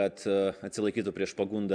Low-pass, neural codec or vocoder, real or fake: 9.9 kHz; none; real